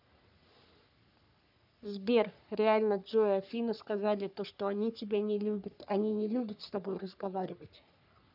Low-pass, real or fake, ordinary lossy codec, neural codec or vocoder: 5.4 kHz; fake; none; codec, 44.1 kHz, 3.4 kbps, Pupu-Codec